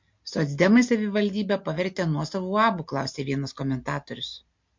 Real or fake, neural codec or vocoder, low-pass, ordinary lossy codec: real; none; 7.2 kHz; MP3, 48 kbps